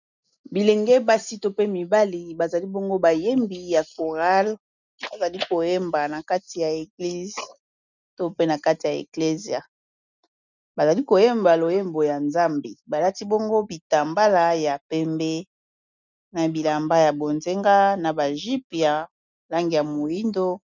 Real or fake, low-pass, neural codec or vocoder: real; 7.2 kHz; none